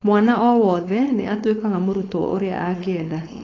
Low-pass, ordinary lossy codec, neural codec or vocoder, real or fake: 7.2 kHz; AAC, 32 kbps; codec, 16 kHz, 4.8 kbps, FACodec; fake